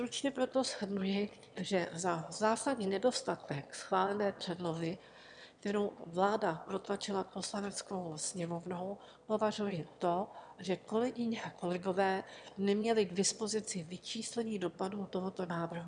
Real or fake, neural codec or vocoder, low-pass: fake; autoencoder, 22.05 kHz, a latent of 192 numbers a frame, VITS, trained on one speaker; 9.9 kHz